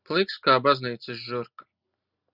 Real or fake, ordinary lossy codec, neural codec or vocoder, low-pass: real; Opus, 64 kbps; none; 5.4 kHz